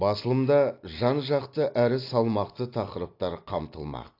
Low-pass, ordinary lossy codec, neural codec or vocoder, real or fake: 5.4 kHz; AAC, 32 kbps; none; real